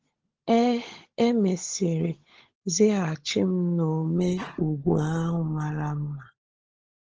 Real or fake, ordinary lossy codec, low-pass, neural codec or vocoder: fake; Opus, 16 kbps; 7.2 kHz; codec, 16 kHz, 16 kbps, FunCodec, trained on LibriTTS, 50 frames a second